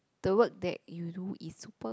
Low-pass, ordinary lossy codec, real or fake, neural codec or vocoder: none; none; real; none